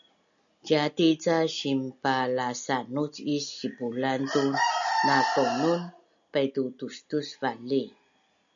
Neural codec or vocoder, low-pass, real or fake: none; 7.2 kHz; real